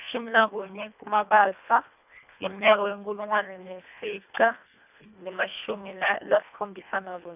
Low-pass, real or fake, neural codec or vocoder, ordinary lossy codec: 3.6 kHz; fake; codec, 24 kHz, 1.5 kbps, HILCodec; none